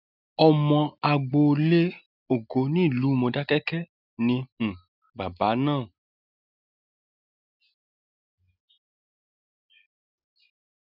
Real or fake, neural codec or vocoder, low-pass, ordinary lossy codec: real; none; 5.4 kHz; none